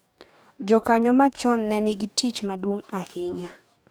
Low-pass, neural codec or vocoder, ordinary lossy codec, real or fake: none; codec, 44.1 kHz, 2.6 kbps, DAC; none; fake